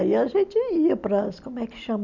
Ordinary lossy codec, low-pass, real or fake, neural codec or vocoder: none; 7.2 kHz; real; none